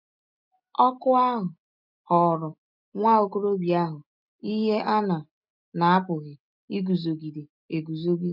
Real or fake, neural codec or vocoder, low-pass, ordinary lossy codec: real; none; 5.4 kHz; none